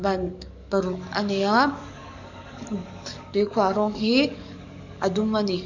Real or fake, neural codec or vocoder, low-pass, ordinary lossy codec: fake; codec, 44.1 kHz, 7.8 kbps, DAC; 7.2 kHz; none